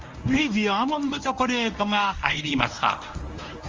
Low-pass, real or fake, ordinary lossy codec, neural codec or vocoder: 7.2 kHz; fake; Opus, 32 kbps; codec, 24 kHz, 0.9 kbps, WavTokenizer, medium speech release version 1